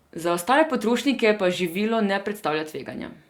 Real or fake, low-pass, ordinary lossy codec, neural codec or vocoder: real; 19.8 kHz; Opus, 64 kbps; none